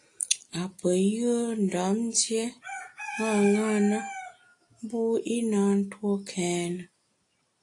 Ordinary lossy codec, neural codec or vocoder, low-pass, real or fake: AAC, 48 kbps; none; 10.8 kHz; real